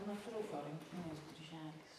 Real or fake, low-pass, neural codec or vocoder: fake; 14.4 kHz; vocoder, 44.1 kHz, 128 mel bands every 512 samples, BigVGAN v2